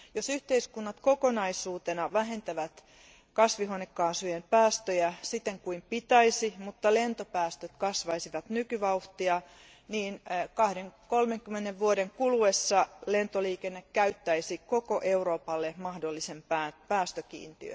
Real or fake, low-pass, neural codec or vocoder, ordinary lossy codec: real; none; none; none